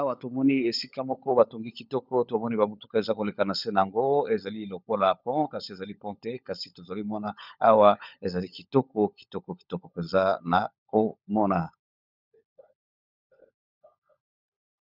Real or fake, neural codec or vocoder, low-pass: fake; codec, 16 kHz, 16 kbps, FunCodec, trained on LibriTTS, 50 frames a second; 5.4 kHz